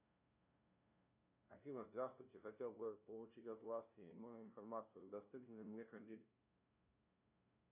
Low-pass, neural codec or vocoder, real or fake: 3.6 kHz; codec, 16 kHz, 0.5 kbps, FunCodec, trained on LibriTTS, 25 frames a second; fake